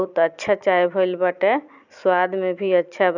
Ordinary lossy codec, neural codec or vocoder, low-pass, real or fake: none; none; 7.2 kHz; real